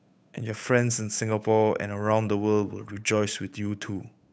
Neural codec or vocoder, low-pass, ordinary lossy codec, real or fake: codec, 16 kHz, 8 kbps, FunCodec, trained on Chinese and English, 25 frames a second; none; none; fake